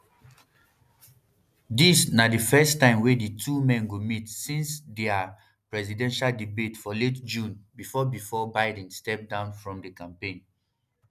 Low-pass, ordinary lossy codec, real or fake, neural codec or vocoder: 14.4 kHz; none; real; none